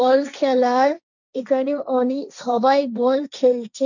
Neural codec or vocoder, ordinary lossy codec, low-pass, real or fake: codec, 16 kHz, 1.1 kbps, Voila-Tokenizer; none; 7.2 kHz; fake